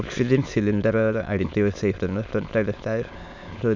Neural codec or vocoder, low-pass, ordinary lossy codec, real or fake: autoencoder, 22.05 kHz, a latent of 192 numbers a frame, VITS, trained on many speakers; 7.2 kHz; none; fake